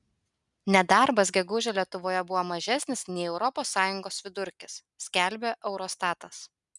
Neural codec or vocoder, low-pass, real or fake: none; 10.8 kHz; real